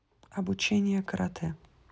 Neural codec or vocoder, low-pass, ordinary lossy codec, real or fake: none; none; none; real